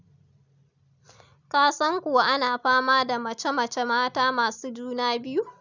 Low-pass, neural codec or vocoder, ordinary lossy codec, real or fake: 7.2 kHz; none; none; real